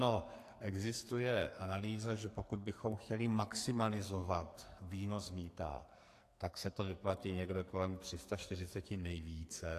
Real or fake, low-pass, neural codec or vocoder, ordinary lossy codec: fake; 14.4 kHz; codec, 44.1 kHz, 2.6 kbps, SNAC; AAC, 64 kbps